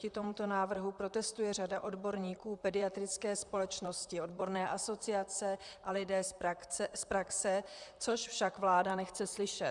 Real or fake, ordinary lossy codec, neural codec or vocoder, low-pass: fake; Opus, 64 kbps; vocoder, 44.1 kHz, 128 mel bands, Pupu-Vocoder; 10.8 kHz